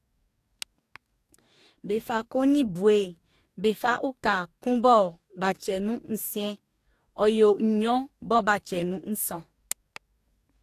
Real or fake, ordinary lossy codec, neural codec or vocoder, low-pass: fake; AAC, 64 kbps; codec, 44.1 kHz, 2.6 kbps, DAC; 14.4 kHz